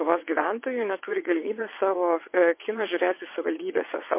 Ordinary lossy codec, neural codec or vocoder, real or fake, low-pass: MP3, 24 kbps; vocoder, 22.05 kHz, 80 mel bands, WaveNeXt; fake; 3.6 kHz